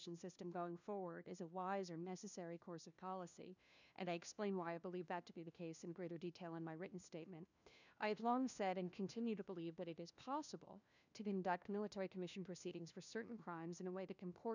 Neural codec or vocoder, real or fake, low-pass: codec, 16 kHz, 1 kbps, FunCodec, trained on LibriTTS, 50 frames a second; fake; 7.2 kHz